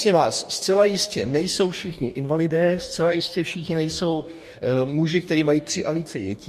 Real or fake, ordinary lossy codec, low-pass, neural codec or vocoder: fake; MP3, 64 kbps; 14.4 kHz; codec, 44.1 kHz, 2.6 kbps, DAC